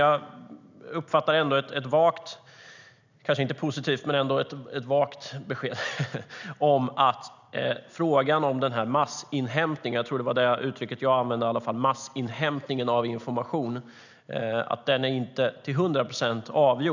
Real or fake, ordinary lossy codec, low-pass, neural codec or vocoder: real; none; 7.2 kHz; none